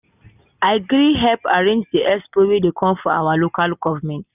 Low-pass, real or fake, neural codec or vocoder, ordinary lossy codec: 3.6 kHz; real; none; none